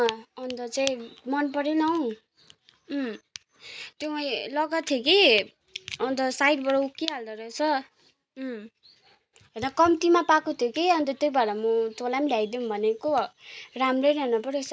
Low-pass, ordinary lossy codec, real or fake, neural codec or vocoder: none; none; real; none